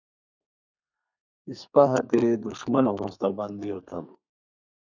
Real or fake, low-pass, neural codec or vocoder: fake; 7.2 kHz; codec, 32 kHz, 1.9 kbps, SNAC